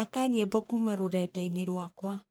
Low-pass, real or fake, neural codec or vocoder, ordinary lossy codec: none; fake; codec, 44.1 kHz, 1.7 kbps, Pupu-Codec; none